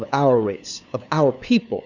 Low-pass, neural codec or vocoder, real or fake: 7.2 kHz; codec, 16 kHz, 2 kbps, FunCodec, trained on LibriTTS, 25 frames a second; fake